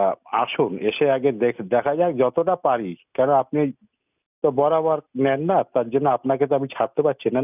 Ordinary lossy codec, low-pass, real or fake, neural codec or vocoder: none; 3.6 kHz; real; none